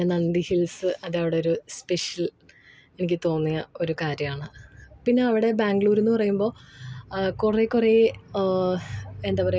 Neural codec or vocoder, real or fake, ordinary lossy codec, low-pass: none; real; none; none